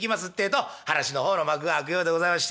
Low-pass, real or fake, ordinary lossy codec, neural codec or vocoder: none; real; none; none